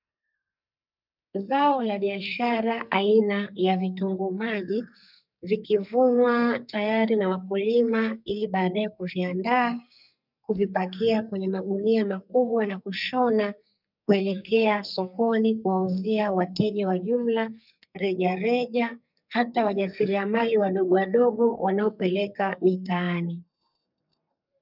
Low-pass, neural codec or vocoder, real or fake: 5.4 kHz; codec, 44.1 kHz, 2.6 kbps, SNAC; fake